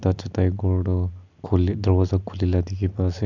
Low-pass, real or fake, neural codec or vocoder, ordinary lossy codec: 7.2 kHz; real; none; MP3, 64 kbps